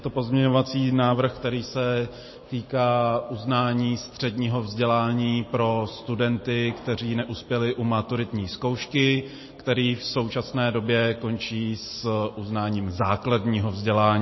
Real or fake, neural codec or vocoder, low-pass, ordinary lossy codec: real; none; 7.2 kHz; MP3, 24 kbps